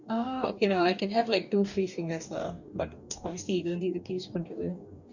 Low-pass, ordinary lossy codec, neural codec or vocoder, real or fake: 7.2 kHz; none; codec, 44.1 kHz, 2.6 kbps, DAC; fake